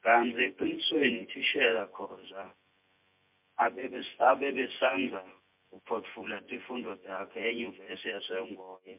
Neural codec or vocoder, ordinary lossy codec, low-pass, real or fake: vocoder, 24 kHz, 100 mel bands, Vocos; MP3, 32 kbps; 3.6 kHz; fake